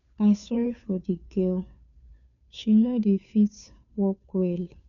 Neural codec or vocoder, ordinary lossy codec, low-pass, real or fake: codec, 16 kHz, 2 kbps, FunCodec, trained on Chinese and English, 25 frames a second; none; 7.2 kHz; fake